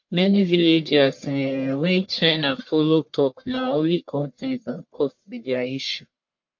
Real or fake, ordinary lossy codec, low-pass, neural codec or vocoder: fake; MP3, 48 kbps; 7.2 kHz; codec, 44.1 kHz, 1.7 kbps, Pupu-Codec